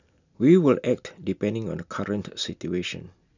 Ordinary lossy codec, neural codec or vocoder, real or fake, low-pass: none; none; real; 7.2 kHz